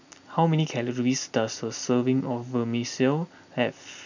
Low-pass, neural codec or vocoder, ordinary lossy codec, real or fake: 7.2 kHz; none; none; real